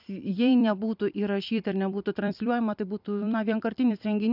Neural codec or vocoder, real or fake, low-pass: vocoder, 44.1 kHz, 128 mel bands every 256 samples, BigVGAN v2; fake; 5.4 kHz